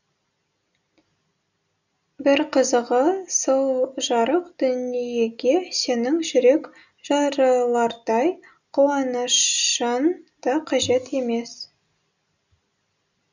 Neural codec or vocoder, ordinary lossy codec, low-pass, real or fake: none; none; 7.2 kHz; real